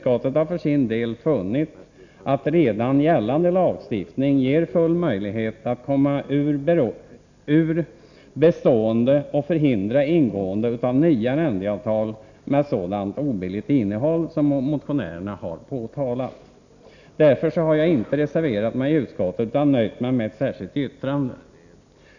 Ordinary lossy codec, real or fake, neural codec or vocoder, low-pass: none; real; none; 7.2 kHz